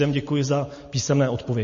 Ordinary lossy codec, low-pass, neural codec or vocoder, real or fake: MP3, 32 kbps; 7.2 kHz; none; real